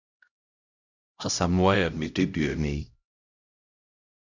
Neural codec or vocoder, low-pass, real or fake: codec, 16 kHz, 0.5 kbps, X-Codec, HuBERT features, trained on LibriSpeech; 7.2 kHz; fake